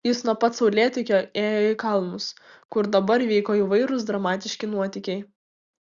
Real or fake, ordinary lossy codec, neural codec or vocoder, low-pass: real; Opus, 64 kbps; none; 7.2 kHz